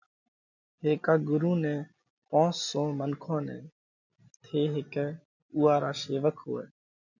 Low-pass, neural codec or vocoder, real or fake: 7.2 kHz; none; real